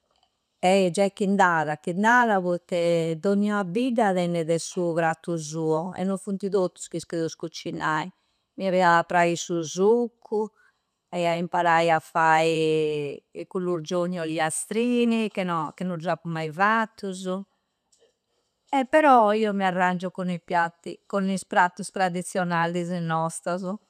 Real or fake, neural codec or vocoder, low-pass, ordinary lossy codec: real; none; 14.4 kHz; none